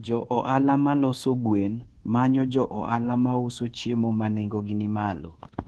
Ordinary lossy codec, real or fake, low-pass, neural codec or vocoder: Opus, 16 kbps; fake; 10.8 kHz; codec, 24 kHz, 1.2 kbps, DualCodec